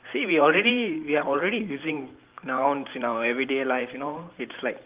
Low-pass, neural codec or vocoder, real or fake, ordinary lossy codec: 3.6 kHz; vocoder, 44.1 kHz, 128 mel bands every 512 samples, BigVGAN v2; fake; Opus, 32 kbps